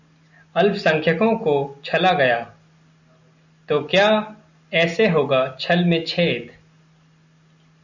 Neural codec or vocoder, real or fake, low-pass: none; real; 7.2 kHz